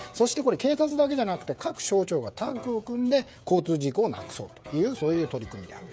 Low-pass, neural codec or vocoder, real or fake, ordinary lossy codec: none; codec, 16 kHz, 16 kbps, FreqCodec, smaller model; fake; none